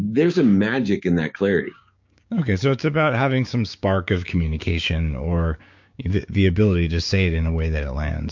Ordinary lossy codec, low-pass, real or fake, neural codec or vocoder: MP3, 48 kbps; 7.2 kHz; fake; codec, 16 kHz, 6 kbps, DAC